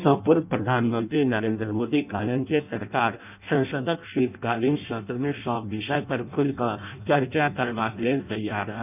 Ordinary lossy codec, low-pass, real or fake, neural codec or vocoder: none; 3.6 kHz; fake; codec, 16 kHz in and 24 kHz out, 0.6 kbps, FireRedTTS-2 codec